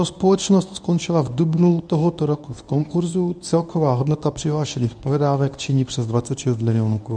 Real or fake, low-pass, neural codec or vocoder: fake; 9.9 kHz; codec, 24 kHz, 0.9 kbps, WavTokenizer, medium speech release version 1